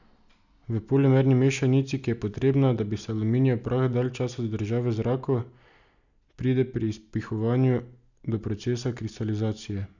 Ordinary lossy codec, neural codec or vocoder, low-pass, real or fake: none; none; 7.2 kHz; real